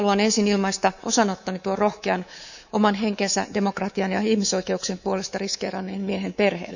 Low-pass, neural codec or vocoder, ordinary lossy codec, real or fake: 7.2 kHz; codec, 24 kHz, 3.1 kbps, DualCodec; none; fake